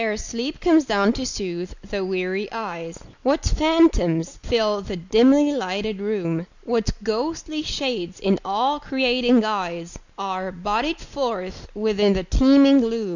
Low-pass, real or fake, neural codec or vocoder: 7.2 kHz; real; none